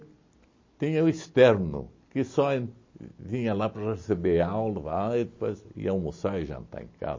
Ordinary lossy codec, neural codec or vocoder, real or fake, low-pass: MP3, 32 kbps; none; real; 7.2 kHz